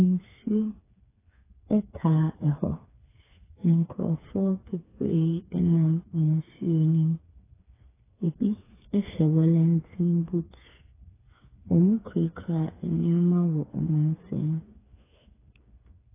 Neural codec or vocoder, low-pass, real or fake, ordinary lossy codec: codec, 16 kHz, 4 kbps, FreqCodec, smaller model; 3.6 kHz; fake; AAC, 16 kbps